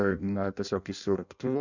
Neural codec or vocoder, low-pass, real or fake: codec, 16 kHz in and 24 kHz out, 0.6 kbps, FireRedTTS-2 codec; 7.2 kHz; fake